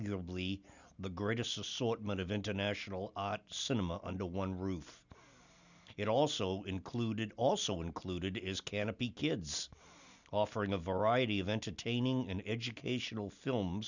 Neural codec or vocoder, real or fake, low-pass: none; real; 7.2 kHz